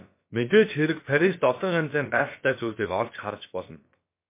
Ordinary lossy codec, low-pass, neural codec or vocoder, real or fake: MP3, 24 kbps; 3.6 kHz; codec, 16 kHz, about 1 kbps, DyCAST, with the encoder's durations; fake